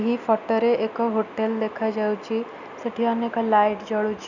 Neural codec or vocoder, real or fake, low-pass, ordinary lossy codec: none; real; 7.2 kHz; none